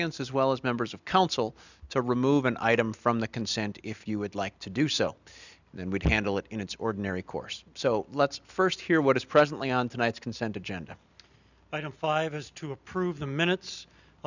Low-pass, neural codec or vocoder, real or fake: 7.2 kHz; none; real